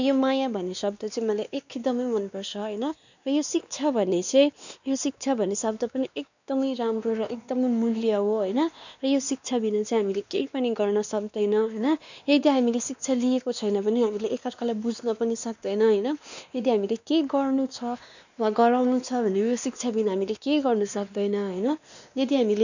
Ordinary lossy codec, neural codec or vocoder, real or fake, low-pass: none; codec, 16 kHz, 2 kbps, X-Codec, WavLM features, trained on Multilingual LibriSpeech; fake; 7.2 kHz